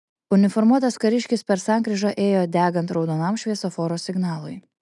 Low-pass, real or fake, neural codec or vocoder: 10.8 kHz; real; none